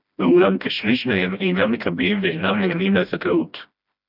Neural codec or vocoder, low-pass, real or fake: codec, 16 kHz, 1 kbps, FreqCodec, smaller model; 5.4 kHz; fake